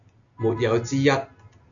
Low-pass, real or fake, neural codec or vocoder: 7.2 kHz; real; none